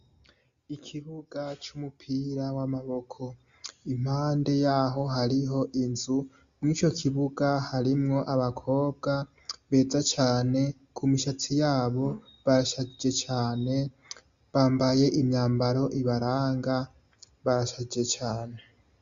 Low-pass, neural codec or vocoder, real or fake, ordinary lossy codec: 7.2 kHz; none; real; Opus, 64 kbps